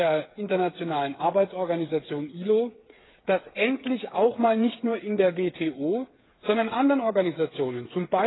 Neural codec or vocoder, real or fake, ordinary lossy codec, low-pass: codec, 16 kHz, 8 kbps, FreqCodec, smaller model; fake; AAC, 16 kbps; 7.2 kHz